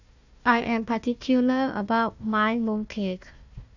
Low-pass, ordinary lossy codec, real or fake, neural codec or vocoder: 7.2 kHz; none; fake; codec, 16 kHz, 1 kbps, FunCodec, trained on Chinese and English, 50 frames a second